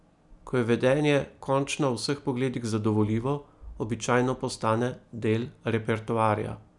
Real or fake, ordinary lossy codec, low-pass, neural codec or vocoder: real; none; 10.8 kHz; none